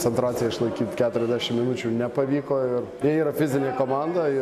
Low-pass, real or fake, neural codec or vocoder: 14.4 kHz; real; none